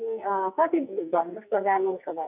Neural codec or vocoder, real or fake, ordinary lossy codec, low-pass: codec, 44.1 kHz, 2.6 kbps, SNAC; fake; none; 3.6 kHz